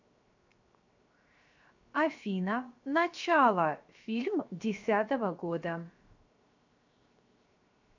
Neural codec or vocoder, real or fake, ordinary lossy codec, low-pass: codec, 16 kHz, 0.7 kbps, FocalCodec; fake; AAC, 48 kbps; 7.2 kHz